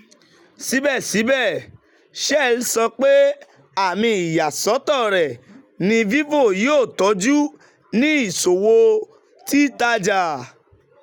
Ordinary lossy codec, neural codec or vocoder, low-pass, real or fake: none; none; 19.8 kHz; real